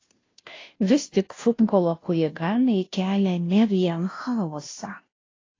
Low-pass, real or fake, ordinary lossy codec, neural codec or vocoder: 7.2 kHz; fake; AAC, 32 kbps; codec, 16 kHz, 0.5 kbps, FunCodec, trained on Chinese and English, 25 frames a second